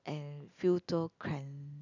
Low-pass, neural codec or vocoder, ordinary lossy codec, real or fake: 7.2 kHz; none; none; real